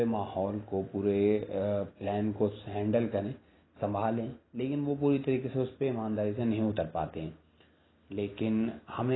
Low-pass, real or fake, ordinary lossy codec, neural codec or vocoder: 7.2 kHz; real; AAC, 16 kbps; none